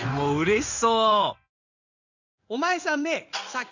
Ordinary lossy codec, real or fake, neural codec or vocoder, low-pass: none; fake; codec, 16 kHz in and 24 kHz out, 1 kbps, XY-Tokenizer; 7.2 kHz